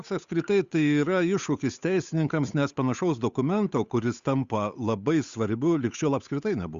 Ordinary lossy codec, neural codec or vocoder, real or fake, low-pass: Opus, 64 kbps; codec, 16 kHz, 8 kbps, FunCodec, trained on Chinese and English, 25 frames a second; fake; 7.2 kHz